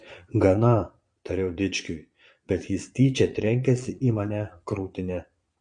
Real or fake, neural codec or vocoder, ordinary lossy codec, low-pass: fake; vocoder, 22.05 kHz, 80 mel bands, Vocos; MP3, 48 kbps; 9.9 kHz